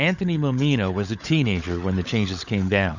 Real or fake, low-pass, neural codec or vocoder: fake; 7.2 kHz; codec, 16 kHz, 16 kbps, FunCodec, trained on LibriTTS, 50 frames a second